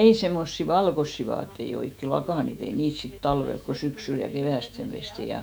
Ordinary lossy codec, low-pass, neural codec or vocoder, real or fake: none; none; autoencoder, 48 kHz, 128 numbers a frame, DAC-VAE, trained on Japanese speech; fake